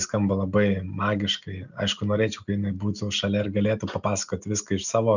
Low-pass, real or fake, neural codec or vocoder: 7.2 kHz; real; none